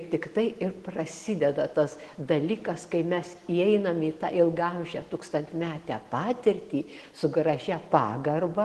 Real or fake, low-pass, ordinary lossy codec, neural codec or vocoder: real; 10.8 kHz; Opus, 16 kbps; none